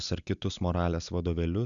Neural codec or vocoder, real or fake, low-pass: none; real; 7.2 kHz